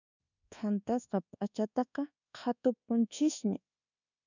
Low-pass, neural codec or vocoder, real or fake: 7.2 kHz; codec, 24 kHz, 1.2 kbps, DualCodec; fake